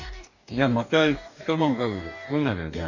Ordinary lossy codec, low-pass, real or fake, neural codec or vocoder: none; 7.2 kHz; fake; codec, 44.1 kHz, 2.6 kbps, DAC